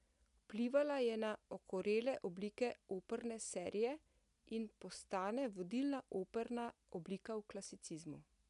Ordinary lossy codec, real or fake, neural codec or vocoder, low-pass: none; real; none; 10.8 kHz